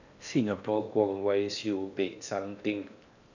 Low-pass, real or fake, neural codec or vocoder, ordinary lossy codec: 7.2 kHz; fake; codec, 16 kHz in and 24 kHz out, 0.6 kbps, FocalCodec, streaming, 4096 codes; none